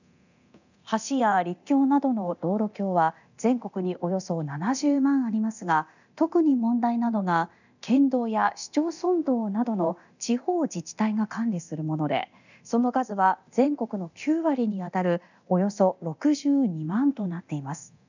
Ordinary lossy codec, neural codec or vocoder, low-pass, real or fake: none; codec, 24 kHz, 0.9 kbps, DualCodec; 7.2 kHz; fake